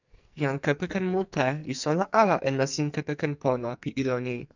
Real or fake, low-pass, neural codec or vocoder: fake; 7.2 kHz; codec, 44.1 kHz, 2.6 kbps, SNAC